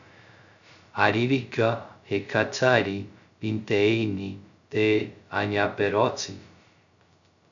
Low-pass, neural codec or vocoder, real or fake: 7.2 kHz; codec, 16 kHz, 0.2 kbps, FocalCodec; fake